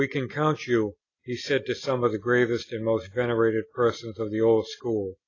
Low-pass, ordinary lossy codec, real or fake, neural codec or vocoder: 7.2 kHz; AAC, 32 kbps; real; none